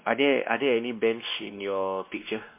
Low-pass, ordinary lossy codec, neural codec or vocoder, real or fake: 3.6 kHz; MP3, 24 kbps; codec, 16 kHz, 2 kbps, X-Codec, WavLM features, trained on Multilingual LibriSpeech; fake